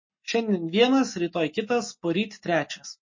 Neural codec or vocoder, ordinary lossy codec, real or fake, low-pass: none; MP3, 32 kbps; real; 7.2 kHz